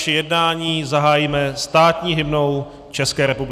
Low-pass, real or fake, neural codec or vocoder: 14.4 kHz; real; none